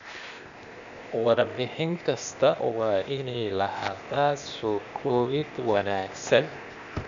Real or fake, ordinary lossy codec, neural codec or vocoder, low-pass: fake; none; codec, 16 kHz, 0.8 kbps, ZipCodec; 7.2 kHz